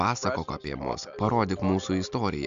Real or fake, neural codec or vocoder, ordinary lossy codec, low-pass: real; none; AAC, 96 kbps; 7.2 kHz